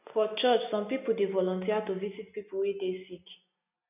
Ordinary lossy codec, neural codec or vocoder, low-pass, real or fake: none; none; 3.6 kHz; real